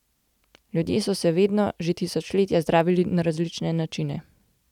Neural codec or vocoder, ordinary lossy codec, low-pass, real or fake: none; none; 19.8 kHz; real